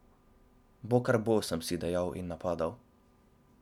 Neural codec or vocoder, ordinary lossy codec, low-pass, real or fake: none; none; 19.8 kHz; real